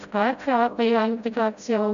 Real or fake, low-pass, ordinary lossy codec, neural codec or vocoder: fake; 7.2 kHz; none; codec, 16 kHz, 0.5 kbps, FreqCodec, smaller model